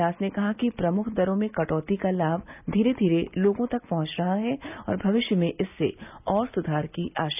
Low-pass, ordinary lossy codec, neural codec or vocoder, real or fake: 3.6 kHz; none; none; real